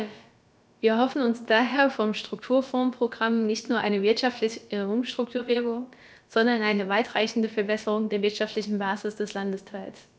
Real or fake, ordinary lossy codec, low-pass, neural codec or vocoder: fake; none; none; codec, 16 kHz, about 1 kbps, DyCAST, with the encoder's durations